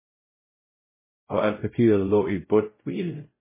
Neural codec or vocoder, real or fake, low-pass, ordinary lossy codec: codec, 16 kHz, 0.5 kbps, X-Codec, WavLM features, trained on Multilingual LibriSpeech; fake; 3.6 kHz; MP3, 16 kbps